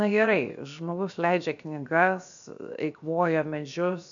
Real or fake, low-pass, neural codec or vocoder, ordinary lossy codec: fake; 7.2 kHz; codec, 16 kHz, 0.7 kbps, FocalCodec; AAC, 64 kbps